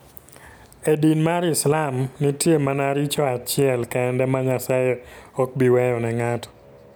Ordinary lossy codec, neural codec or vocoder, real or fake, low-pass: none; none; real; none